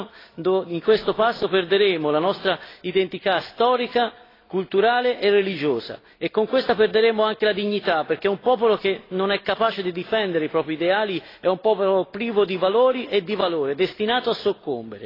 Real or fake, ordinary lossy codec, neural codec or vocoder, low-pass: real; AAC, 24 kbps; none; 5.4 kHz